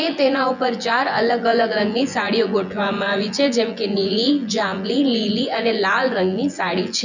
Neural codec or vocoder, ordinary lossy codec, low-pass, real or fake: vocoder, 24 kHz, 100 mel bands, Vocos; none; 7.2 kHz; fake